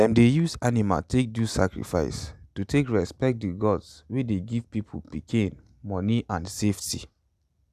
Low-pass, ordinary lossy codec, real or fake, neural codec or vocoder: 14.4 kHz; none; real; none